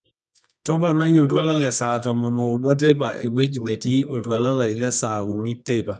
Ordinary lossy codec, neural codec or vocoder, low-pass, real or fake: none; codec, 24 kHz, 0.9 kbps, WavTokenizer, medium music audio release; none; fake